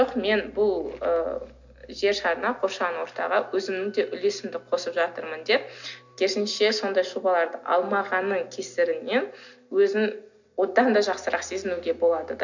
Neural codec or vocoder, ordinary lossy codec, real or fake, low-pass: none; none; real; 7.2 kHz